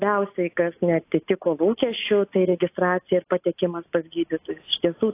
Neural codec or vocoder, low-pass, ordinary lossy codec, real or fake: none; 3.6 kHz; AAC, 24 kbps; real